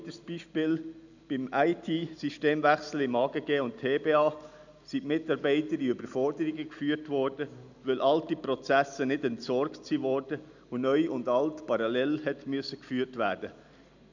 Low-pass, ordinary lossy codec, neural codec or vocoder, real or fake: 7.2 kHz; none; none; real